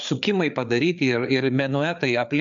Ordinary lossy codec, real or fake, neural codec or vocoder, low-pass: MP3, 64 kbps; fake; codec, 16 kHz, 4 kbps, FreqCodec, larger model; 7.2 kHz